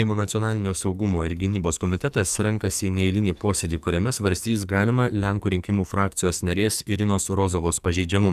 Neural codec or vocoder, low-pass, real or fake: codec, 44.1 kHz, 2.6 kbps, SNAC; 14.4 kHz; fake